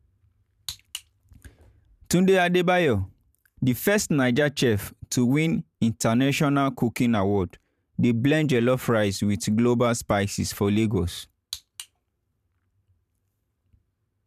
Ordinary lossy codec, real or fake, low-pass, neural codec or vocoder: none; fake; 14.4 kHz; vocoder, 44.1 kHz, 128 mel bands every 512 samples, BigVGAN v2